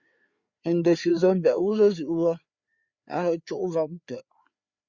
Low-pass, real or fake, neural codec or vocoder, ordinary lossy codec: 7.2 kHz; fake; codec, 16 kHz, 4 kbps, FreqCodec, larger model; Opus, 64 kbps